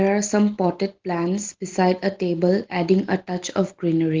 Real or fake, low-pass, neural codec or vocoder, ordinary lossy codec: real; 7.2 kHz; none; Opus, 16 kbps